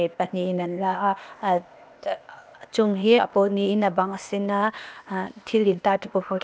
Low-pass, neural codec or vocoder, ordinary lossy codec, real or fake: none; codec, 16 kHz, 0.8 kbps, ZipCodec; none; fake